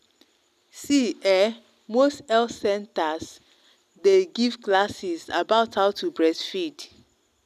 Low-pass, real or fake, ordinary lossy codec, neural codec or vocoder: 14.4 kHz; real; none; none